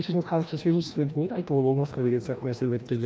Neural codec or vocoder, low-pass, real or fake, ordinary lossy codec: codec, 16 kHz, 1 kbps, FreqCodec, larger model; none; fake; none